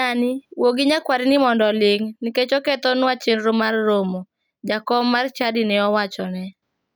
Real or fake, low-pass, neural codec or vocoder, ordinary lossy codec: real; none; none; none